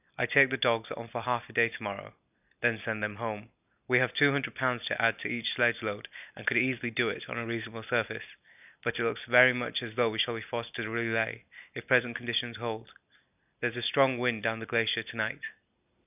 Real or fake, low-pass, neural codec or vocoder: real; 3.6 kHz; none